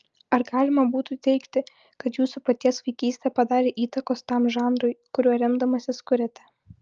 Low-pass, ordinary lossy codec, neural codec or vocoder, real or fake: 7.2 kHz; Opus, 24 kbps; none; real